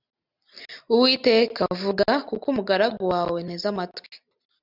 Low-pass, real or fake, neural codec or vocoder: 5.4 kHz; real; none